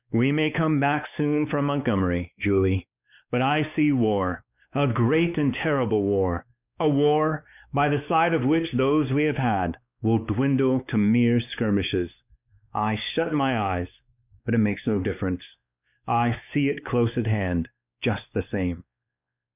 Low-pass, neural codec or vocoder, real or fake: 3.6 kHz; codec, 16 kHz, 2 kbps, X-Codec, WavLM features, trained on Multilingual LibriSpeech; fake